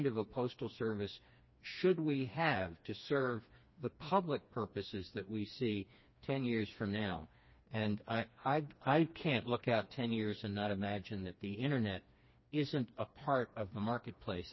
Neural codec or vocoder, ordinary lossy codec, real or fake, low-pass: codec, 16 kHz, 2 kbps, FreqCodec, smaller model; MP3, 24 kbps; fake; 7.2 kHz